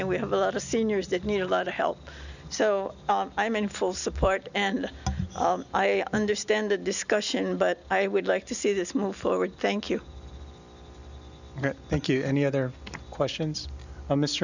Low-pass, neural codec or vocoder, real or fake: 7.2 kHz; none; real